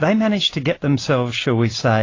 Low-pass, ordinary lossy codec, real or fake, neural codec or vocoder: 7.2 kHz; AAC, 32 kbps; real; none